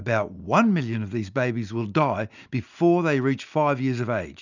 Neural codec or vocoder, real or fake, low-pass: none; real; 7.2 kHz